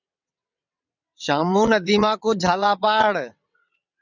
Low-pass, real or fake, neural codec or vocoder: 7.2 kHz; fake; vocoder, 22.05 kHz, 80 mel bands, WaveNeXt